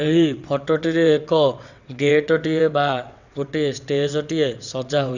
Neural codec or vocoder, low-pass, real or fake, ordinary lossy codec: vocoder, 22.05 kHz, 80 mel bands, WaveNeXt; 7.2 kHz; fake; none